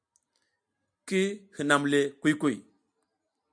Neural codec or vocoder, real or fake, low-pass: none; real; 9.9 kHz